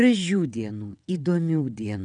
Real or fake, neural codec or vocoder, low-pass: fake; vocoder, 22.05 kHz, 80 mel bands, WaveNeXt; 9.9 kHz